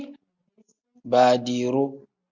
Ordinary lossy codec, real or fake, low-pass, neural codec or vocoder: Opus, 64 kbps; real; 7.2 kHz; none